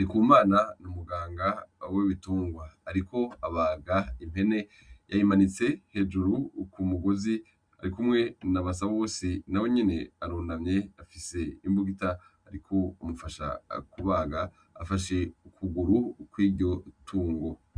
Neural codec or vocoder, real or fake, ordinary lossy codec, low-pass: none; real; Opus, 64 kbps; 9.9 kHz